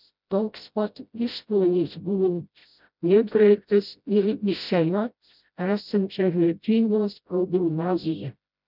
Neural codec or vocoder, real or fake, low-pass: codec, 16 kHz, 0.5 kbps, FreqCodec, smaller model; fake; 5.4 kHz